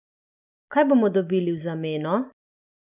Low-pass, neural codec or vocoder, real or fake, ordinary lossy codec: 3.6 kHz; none; real; none